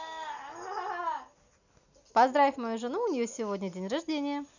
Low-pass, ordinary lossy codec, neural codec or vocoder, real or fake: 7.2 kHz; none; none; real